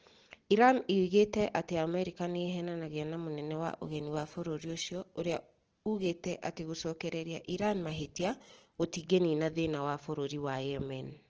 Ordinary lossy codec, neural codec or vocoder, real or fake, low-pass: Opus, 16 kbps; none; real; 7.2 kHz